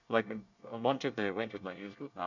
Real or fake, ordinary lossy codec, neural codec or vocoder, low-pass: fake; none; codec, 24 kHz, 1 kbps, SNAC; 7.2 kHz